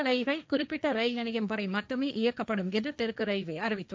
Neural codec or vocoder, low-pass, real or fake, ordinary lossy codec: codec, 16 kHz, 1.1 kbps, Voila-Tokenizer; none; fake; none